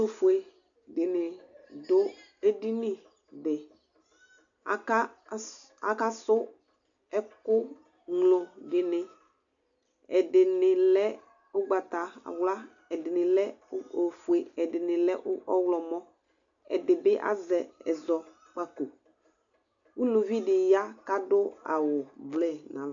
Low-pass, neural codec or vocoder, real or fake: 7.2 kHz; none; real